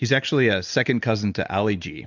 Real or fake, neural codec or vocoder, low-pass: real; none; 7.2 kHz